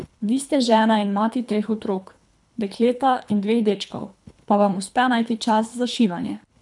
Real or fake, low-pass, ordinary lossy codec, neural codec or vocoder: fake; 10.8 kHz; none; codec, 24 kHz, 3 kbps, HILCodec